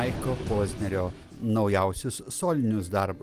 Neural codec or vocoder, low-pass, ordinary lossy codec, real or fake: none; 14.4 kHz; Opus, 24 kbps; real